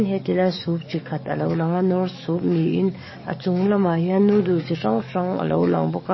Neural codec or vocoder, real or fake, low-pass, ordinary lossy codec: codec, 44.1 kHz, 7.8 kbps, DAC; fake; 7.2 kHz; MP3, 24 kbps